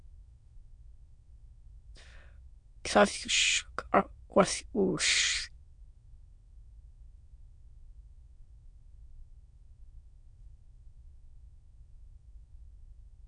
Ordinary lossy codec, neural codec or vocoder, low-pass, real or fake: MP3, 64 kbps; autoencoder, 22.05 kHz, a latent of 192 numbers a frame, VITS, trained on many speakers; 9.9 kHz; fake